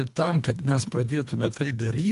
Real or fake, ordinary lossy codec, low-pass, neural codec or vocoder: fake; AAC, 64 kbps; 10.8 kHz; codec, 24 kHz, 1.5 kbps, HILCodec